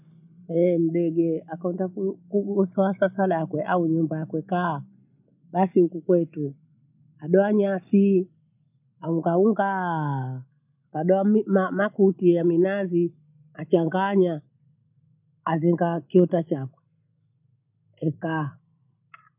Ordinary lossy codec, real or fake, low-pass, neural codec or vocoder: none; real; 3.6 kHz; none